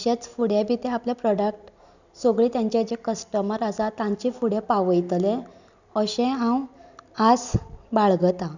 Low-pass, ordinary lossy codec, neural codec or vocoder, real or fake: 7.2 kHz; none; none; real